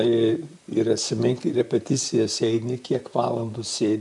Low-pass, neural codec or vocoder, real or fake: 10.8 kHz; vocoder, 44.1 kHz, 128 mel bands, Pupu-Vocoder; fake